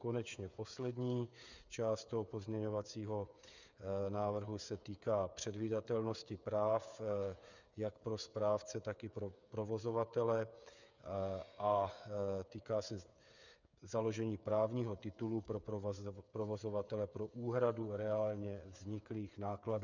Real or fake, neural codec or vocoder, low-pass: fake; codec, 16 kHz, 8 kbps, FreqCodec, smaller model; 7.2 kHz